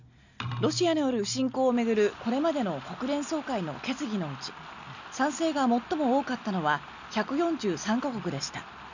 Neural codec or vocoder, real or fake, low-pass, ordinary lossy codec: none; real; 7.2 kHz; none